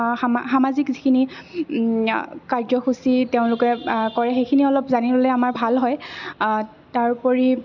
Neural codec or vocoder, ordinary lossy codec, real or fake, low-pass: none; none; real; 7.2 kHz